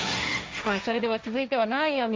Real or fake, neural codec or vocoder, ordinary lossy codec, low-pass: fake; codec, 16 kHz, 1.1 kbps, Voila-Tokenizer; none; none